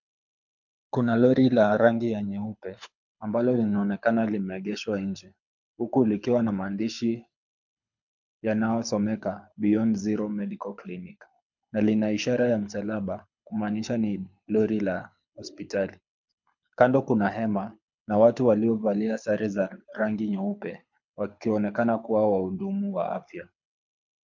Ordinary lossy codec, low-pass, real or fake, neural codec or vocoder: MP3, 64 kbps; 7.2 kHz; fake; codec, 24 kHz, 6 kbps, HILCodec